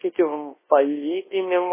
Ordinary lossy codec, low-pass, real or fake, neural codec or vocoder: MP3, 16 kbps; 3.6 kHz; fake; codec, 24 kHz, 0.9 kbps, WavTokenizer, large speech release